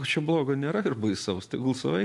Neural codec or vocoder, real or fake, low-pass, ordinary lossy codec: none; real; 10.8 kHz; AAC, 64 kbps